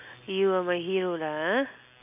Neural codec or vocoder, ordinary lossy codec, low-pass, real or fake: none; none; 3.6 kHz; real